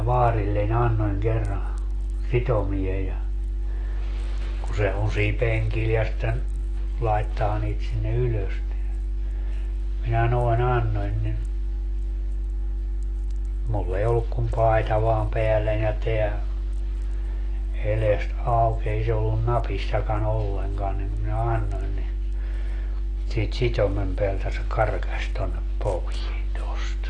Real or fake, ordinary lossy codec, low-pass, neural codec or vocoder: real; AAC, 32 kbps; 9.9 kHz; none